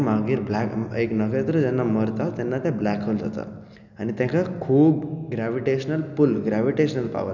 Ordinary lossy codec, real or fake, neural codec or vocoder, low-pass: none; real; none; 7.2 kHz